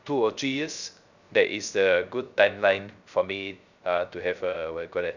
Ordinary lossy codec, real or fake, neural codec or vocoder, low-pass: none; fake; codec, 16 kHz, 0.3 kbps, FocalCodec; 7.2 kHz